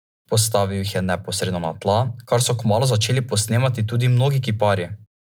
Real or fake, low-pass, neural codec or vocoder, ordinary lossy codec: real; none; none; none